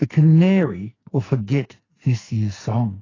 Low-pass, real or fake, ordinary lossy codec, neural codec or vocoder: 7.2 kHz; fake; AAC, 32 kbps; codec, 44.1 kHz, 2.6 kbps, SNAC